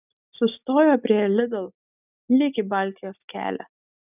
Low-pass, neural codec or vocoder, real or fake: 3.6 kHz; none; real